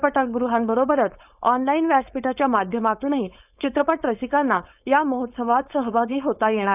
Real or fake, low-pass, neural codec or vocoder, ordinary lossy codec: fake; 3.6 kHz; codec, 16 kHz, 4.8 kbps, FACodec; none